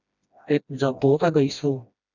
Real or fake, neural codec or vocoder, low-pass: fake; codec, 16 kHz, 1 kbps, FreqCodec, smaller model; 7.2 kHz